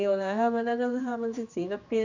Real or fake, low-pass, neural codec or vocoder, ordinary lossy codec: fake; 7.2 kHz; codec, 16 kHz, 2 kbps, X-Codec, HuBERT features, trained on general audio; none